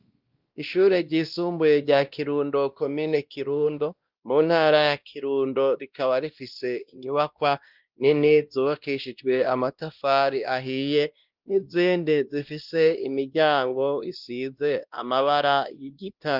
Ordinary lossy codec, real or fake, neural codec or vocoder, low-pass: Opus, 32 kbps; fake; codec, 16 kHz, 1 kbps, X-Codec, WavLM features, trained on Multilingual LibriSpeech; 5.4 kHz